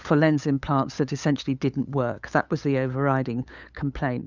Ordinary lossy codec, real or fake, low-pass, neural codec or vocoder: Opus, 64 kbps; fake; 7.2 kHz; codec, 16 kHz, 8 kbps, FunCodec, trained on LibriTTS, 25 frames a second